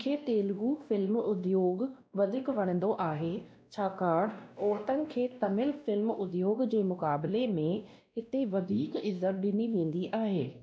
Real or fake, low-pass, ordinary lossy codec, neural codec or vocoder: fake; none; none; codec, 16 kHz, 1 kbps, X-Codec, WavLM features, trained on Multilingual LibriSpeech